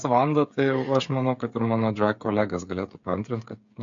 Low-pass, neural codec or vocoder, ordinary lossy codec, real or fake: 7.2 kHz; codec, 16 kHz, 8 kbps, FreqCodec, smaller model; MP3, 48 kbps; fake